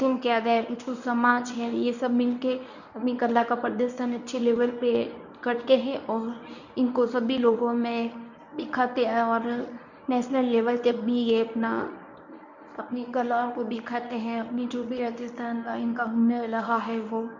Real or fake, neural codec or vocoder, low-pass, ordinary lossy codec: fake; codec, 24 kHz, 0.9 kbps, WavTokenizer, medium speech release version 1; 7.2 kHz; none